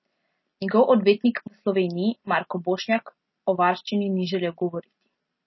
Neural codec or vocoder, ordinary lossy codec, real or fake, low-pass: none; MP3, 24 kbps; real; 7.2 kHz